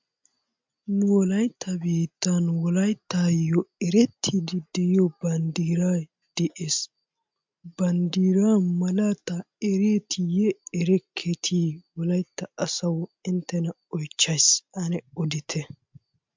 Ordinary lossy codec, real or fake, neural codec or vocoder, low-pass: MP3, 64 kbps; real; none; 7.2 kHz